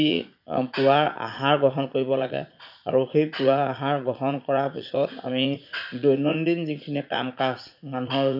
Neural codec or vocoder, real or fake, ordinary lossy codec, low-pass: vocoder, 44.1 kHz, 80 mel bands, Vocos; fake; none; 5.4 kHz